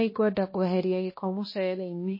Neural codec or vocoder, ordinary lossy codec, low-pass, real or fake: codec, 16 kHz, 1 kbps, X-Codec, HuBERT features, trained on balanced general audio; MP3, 24 kbps; 5.4 kHz; fake